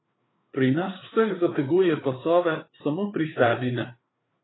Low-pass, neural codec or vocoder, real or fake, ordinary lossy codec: 7.2 kHz; codec, 16 kHz, 4 kbps, FreqCodec, larger model; fake; AAC, 16 kbps